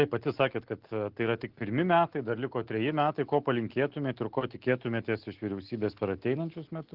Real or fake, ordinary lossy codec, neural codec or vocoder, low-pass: real; Opus, 16 kbps; none; 5.4 kHz